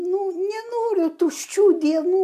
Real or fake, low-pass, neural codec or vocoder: real; 14.4 kHz; none